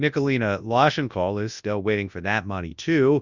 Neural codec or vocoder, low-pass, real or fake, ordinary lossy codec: codec, 24 kHz, 0.9 kbps, WavTokenizer, large speech release; 7.2 kHz; fake; Opus, 64 kbps